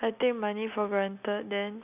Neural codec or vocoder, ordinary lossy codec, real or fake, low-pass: none; none; real; 3.6 kHz